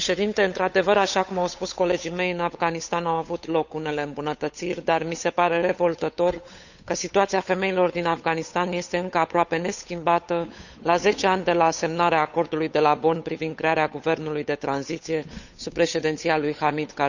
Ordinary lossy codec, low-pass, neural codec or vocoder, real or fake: none; 7.2 kHz; codec, 16 kHz, 8 kbps, FunCodec, trained on Chinese and English, 25 frames a second; fake